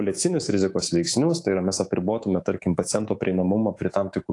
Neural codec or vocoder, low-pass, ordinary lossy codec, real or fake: codec, 24 kHz, 3.1 kbps, DualCodec; 10.8 kHz; AAC, 48 kbps; fake